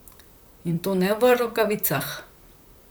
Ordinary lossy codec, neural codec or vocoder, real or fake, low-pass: none; vocoder, 44.1 kHz, 128 mel bands, Pupu-Vocoder; fake; none